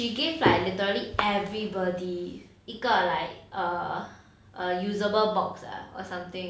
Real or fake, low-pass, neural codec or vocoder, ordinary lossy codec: real; none; none; none